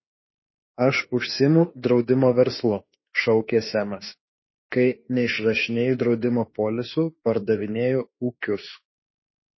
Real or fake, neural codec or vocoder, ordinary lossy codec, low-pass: fake; autoencoder, 48 kHz, 32 numbers a frame, DAC-VAE, trained on Japanese speech; MP3, 24 kbps; 7.2 kHz